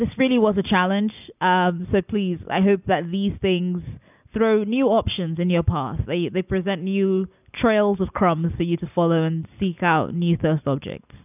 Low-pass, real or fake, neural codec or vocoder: 3.6 kHz; real; none